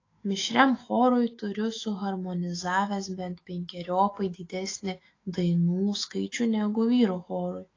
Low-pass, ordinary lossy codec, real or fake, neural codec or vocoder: 7.2 kHz; AAC, 32 kbps; fake; autoencoder, 48 kHz, 128 numbers a frame, DAC-VAE, trained on Japanese speech